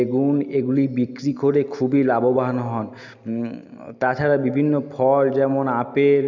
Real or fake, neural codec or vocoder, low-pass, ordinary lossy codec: real; none; 7.2 kHz; none